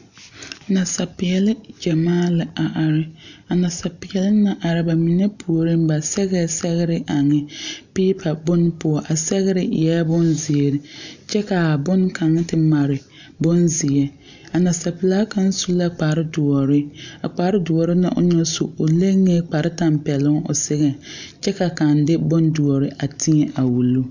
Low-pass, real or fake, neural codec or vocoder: 7.2 kHz; real; none